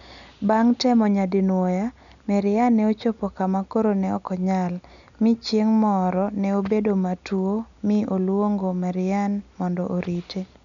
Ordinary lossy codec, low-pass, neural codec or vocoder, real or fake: none; 7.2 kHz; none; real